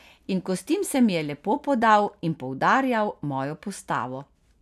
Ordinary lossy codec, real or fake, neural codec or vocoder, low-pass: none; real; none; 14.4 kHz